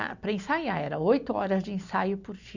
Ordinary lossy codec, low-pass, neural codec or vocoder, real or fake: Opus, 64 kbps; 7.2 kHz; none; real